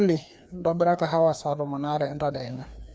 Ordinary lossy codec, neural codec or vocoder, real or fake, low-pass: none; codec, 16 kHz, 2 kbps, FreqCodec, larger model; fake; none